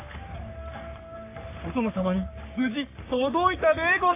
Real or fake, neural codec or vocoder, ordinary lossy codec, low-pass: fake; codec, 44.1 kHz, 3.4 kbps, Pupu-Codec; AAC, 24 kbps; 3.6 kHz